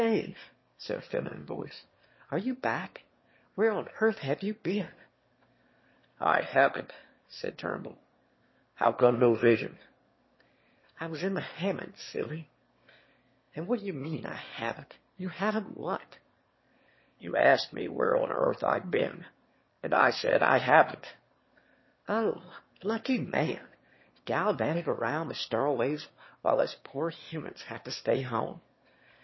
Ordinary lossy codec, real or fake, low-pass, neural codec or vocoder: MP3, 24 kbps; fake; 7.2 kHz; autoencoder, 22.05 kHz, a latent of 192 numbers a frame, VITS, trained on one speaker